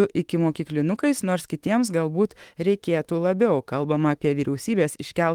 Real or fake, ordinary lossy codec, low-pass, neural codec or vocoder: fake; Opus, 24 kbps; 19.8 kHz; autoencoder, 48 kHz, 32 numbers a frame, DAC-VAE, trained on Japanese speech